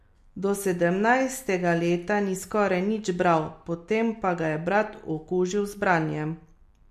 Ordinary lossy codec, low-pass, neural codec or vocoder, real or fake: MP3, 64 kbps; 14.4 kHz; none; real